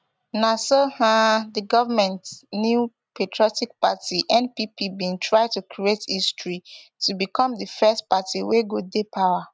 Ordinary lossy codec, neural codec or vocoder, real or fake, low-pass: none; none; real; none